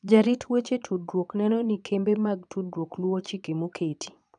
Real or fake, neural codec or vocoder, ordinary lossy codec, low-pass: fake; vocoder, 22.05 kHz, 80 mel bands, Vocos; none; 9.9 kHz